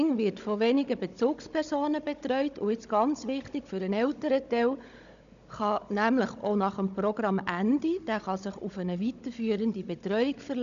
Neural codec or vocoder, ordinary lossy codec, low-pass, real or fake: codec, 16 kHz, 16 kbps, FunCodec, trained on Chinese and English, 50 frames a second; none; 7.2 kHz; fake